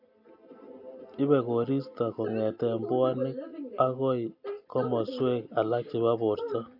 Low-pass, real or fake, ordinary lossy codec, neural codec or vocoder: 5.4 kHz; real; AAC, 48 kbps; none